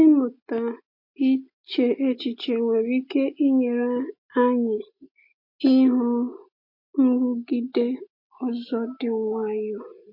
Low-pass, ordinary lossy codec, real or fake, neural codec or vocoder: 5.4 kHz; MP3, 32 kbps; real; none